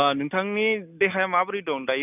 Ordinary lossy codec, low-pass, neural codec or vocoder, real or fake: none; 3.6 kHz; none; real